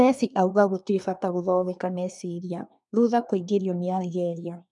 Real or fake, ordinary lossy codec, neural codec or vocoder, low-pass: fake; none; codec, 24 kHz, 1 kbps, SNAC; 10.8 kHz